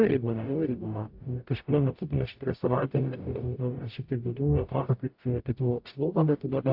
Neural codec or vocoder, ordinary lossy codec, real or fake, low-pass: codec, 44.1 kHz, 0.9 kbps, DAC; AAC, 48 kbps; fake; 5.4 kHz